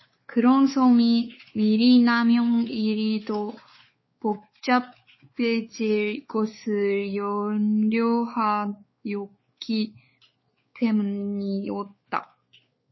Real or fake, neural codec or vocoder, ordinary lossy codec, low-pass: fake; codec, 16 kHz, 4 kbps, X-Codec, WavLM features, trained on Multilingual LibriSpeech; MP3, 24 kbps; 7.2 kHz